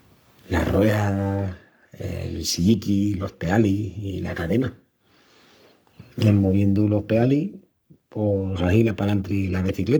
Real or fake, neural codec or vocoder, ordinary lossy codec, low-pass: fake; codec, 44.1 kHz, 3.4 kbps, Pupu-Codec; none; none